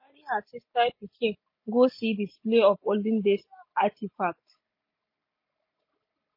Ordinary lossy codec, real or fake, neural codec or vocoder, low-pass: MP3, 24 kbps; real; none; 5.4 kHz